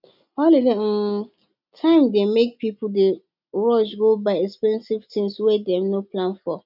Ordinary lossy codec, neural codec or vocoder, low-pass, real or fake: none; none; 5.4 kHz; real